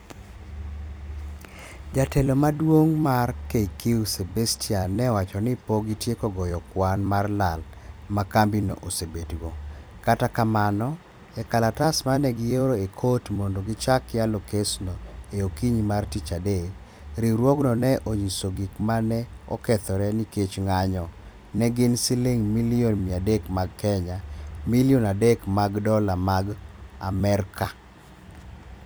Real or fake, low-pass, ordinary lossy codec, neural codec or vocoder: fake; none; none; vocoder, 44.1 kHz, 128 mel bands every 256 samples, BigVGAN v2